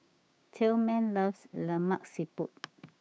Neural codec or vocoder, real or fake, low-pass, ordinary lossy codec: codec, 16 kHz, 6 kbps, DAC; fake; none; none